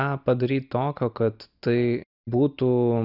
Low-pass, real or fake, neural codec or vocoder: 5.4 kHz; real; none